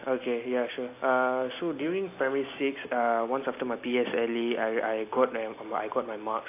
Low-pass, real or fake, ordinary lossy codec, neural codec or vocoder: 3.6 kHz; real; AAC, 24 kbps; none